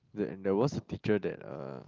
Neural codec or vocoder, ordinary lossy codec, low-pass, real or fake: none; Opus, 32 kbps; 7.2 kHz; real